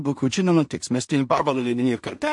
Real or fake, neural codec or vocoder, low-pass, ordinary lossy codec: fake; codec, 16 kHz in and 24 kHz out, 0.4 kbps, LongCat-Audio-Codec, two codebook decoder; 10.8 kHz; MP3, 48 kbps